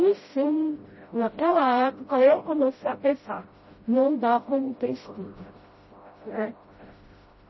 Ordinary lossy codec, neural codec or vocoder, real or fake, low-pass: MP3, 24 kbps; codec, 16 kHz, 0.5 kbps, FreqCodec, smaller model; fake; 7.2 kHz